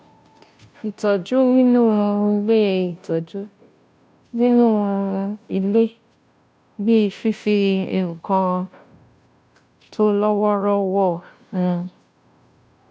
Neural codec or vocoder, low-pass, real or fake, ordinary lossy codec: codec, 16 kHz, 0.5 kbps, FunCodec, trained on Chinese and English, 25 frames a second; none; fake; none